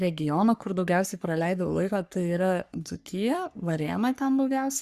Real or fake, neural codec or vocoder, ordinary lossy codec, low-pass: fake; codec, 44.1 kHz, 3.4 kbps, Pupu-Codec; Opus, 64 kbps; 14.4 kHz